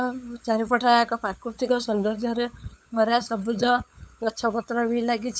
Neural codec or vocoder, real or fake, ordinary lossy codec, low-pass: codec, 16 kHz, 8 kbps, FunCodec, trained on LibriTTS, 25 frames a second; fake; none; none